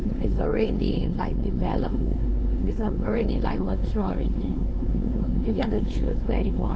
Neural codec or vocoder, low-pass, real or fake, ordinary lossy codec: codec, 16 kHz, 2 kbps, X-Codec, WavLM features, trained on Multilingual LibriSpeech; none; fake; none